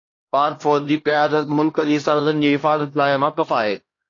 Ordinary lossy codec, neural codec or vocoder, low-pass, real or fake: AAC, 32 kbps; codec, 16 kHz, 1 kbps, X-Codec, HuBERT features, trained on LibriSpeech; 7.2 kHz; fake